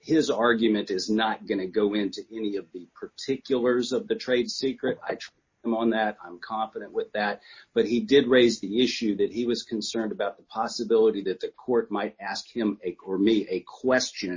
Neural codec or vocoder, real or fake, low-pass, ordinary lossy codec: none; real; 7.2 kHz; MP3, 32 kbps